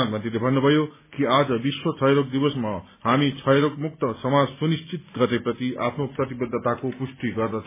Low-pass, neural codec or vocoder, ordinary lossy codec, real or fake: 3.6 kHz; none; MP3, 16 kbps; real